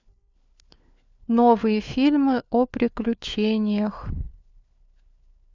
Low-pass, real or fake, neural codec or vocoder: 7.2 kHz; fake; codec, 16 kHz, 4 kbps, FunCodec, trained on LibriTTS, 50 frames a second